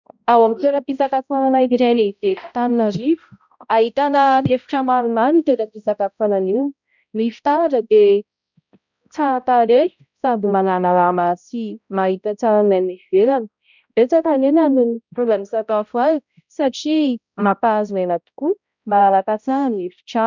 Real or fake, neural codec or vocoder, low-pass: fake; codec, 16 kHz, 0.5 kbps, X-Codec, HuBERT features, trained on balanced general audio; 7.2 kHz